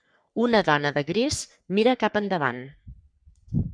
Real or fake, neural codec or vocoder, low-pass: fake; codec, 44.1 kHz, 3.4 kbps, Pupu-Codec; 9.9 kHz